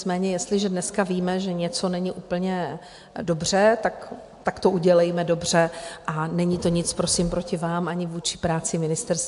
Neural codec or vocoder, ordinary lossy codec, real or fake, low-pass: none; AAC, 64 kbps; real; 10.8 kHz